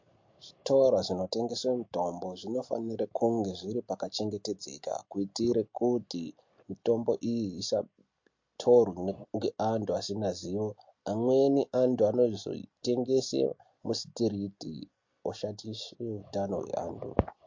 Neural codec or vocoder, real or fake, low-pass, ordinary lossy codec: none; real; 7.2 kHz; MP3, 48 kbps